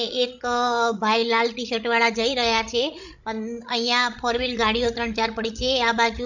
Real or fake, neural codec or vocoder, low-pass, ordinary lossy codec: fake; codec, 16 kHz, 16 kbps, FreqCodec, larger model; 7.2 kHz; none